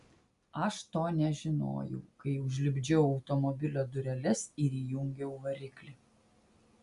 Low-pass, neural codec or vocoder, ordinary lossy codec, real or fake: 10.8 kHz; none; AAC, 96 kbps; real